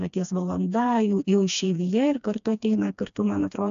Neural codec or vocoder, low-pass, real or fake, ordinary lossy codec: codec, 16 kHz, 2 kbps, FreqCodec, smaller model; 7.2 kHz; fake; AAC, 96 kbps